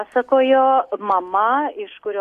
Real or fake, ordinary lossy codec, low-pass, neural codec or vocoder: real; AAC, 64 kbps; 14.4 kHz; none